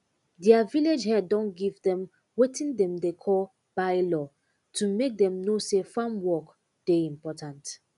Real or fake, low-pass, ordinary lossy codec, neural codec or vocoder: real; 10.8 kHz; none; none